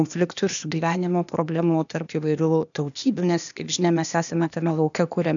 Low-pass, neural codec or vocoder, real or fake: 7.2 kHz; codec, 16 kHz, 0.8 kbps, ZipCodec; fake